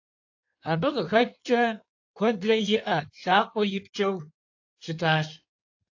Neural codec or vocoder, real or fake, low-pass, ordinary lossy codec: codec, 16 kHz in and 24 kHz out, 1.1 kbps, FireRedTTS-2 codec; fake; 7.2 kHz; AAC, 48 kbps